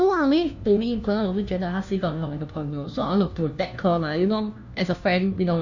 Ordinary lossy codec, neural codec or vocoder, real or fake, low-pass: none; codec, 16 kHz, 1 kbps, FunCodec, trained on LibriTTS, 50 frames a second; fake; 7.2 kHz